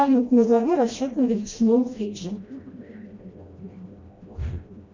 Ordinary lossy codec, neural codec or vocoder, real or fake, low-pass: MP3, 64 kbps; codec, 16 kHz, 1 kbps, FreqCodec, smaller model; fake; 7.2 kHz